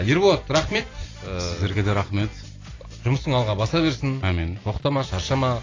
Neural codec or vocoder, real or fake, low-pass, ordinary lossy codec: none; real; 7.2 kHz; AAC, 32 kbps